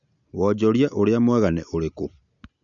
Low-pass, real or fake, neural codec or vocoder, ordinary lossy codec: 7.2 kHz; real; none; none